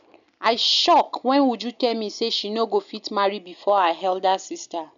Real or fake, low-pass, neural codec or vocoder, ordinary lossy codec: real; 7.2 kHz; none; none